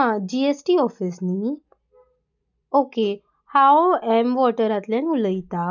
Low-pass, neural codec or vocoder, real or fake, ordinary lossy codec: 7.2 kHz; none; real; none